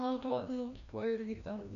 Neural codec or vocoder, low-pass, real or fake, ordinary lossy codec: codec, 16 kHz, 1 kbps, FreqCodec, larger model; 7.2 kHz; fake; MP3, 96 kbps